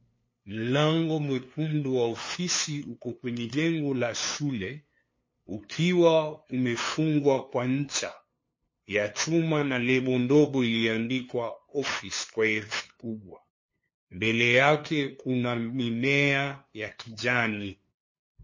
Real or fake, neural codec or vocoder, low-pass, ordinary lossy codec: fake; codec, 16 kHz, 2 kbps, FunCodec, trained on LibriTTS, 25 frames a second; 7.2 kHz; MP3, 32 kbps